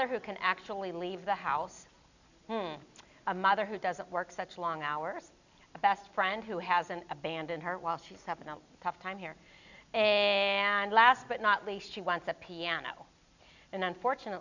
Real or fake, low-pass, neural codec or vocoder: real; 7.2 kHz; none